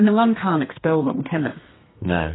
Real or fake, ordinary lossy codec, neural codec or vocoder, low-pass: fake; AAC, 16 kbps; codec, 32 kHz, 1.9 kbps, SNAC; 7.2 kHz